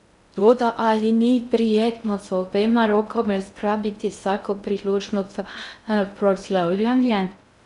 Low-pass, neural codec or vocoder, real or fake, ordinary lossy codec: 10.8 kHz; codec, 16 kHz in and 24 kHz out, 0.6 kbps, FocalCodec, streaming, 2048 codes; fake; none